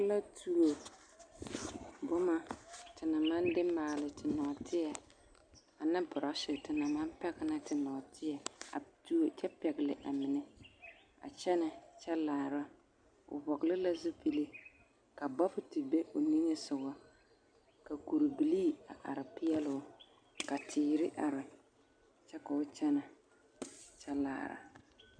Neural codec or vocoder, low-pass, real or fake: none; 9.9 kHz; real